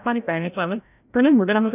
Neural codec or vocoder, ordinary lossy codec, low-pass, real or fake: codec, 16 kHz, 0.5 kbps, FreqCodec, larger model; none; 3.6 kHz; fake